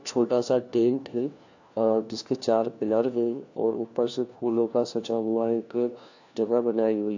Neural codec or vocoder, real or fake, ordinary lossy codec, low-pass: codec, 16 kHz, 1 kbps, FunCodec, trained on LibriTTS, 50 frames a second; fake; AAC, 48 kbps; 7.2 kHz